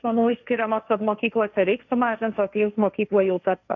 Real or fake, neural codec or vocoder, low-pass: fake; codec, 16 kHz, 1.1 kbps, Voila-Tokenizer; 7.2 kHz